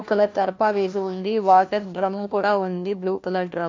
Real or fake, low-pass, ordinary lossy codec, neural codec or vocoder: fake; 7.2 kHz; AAC, 48 kbps; codec, 16 kHz, 1 kbps, FunCodec, trained on LibriTTS, 50 frames a second